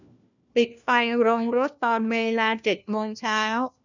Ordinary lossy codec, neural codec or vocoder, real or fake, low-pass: none; codec, 16 kHz, 1 kbps, FunCodec, trained on LibriTTS, 50 frames a second; fake; 7.2 kHz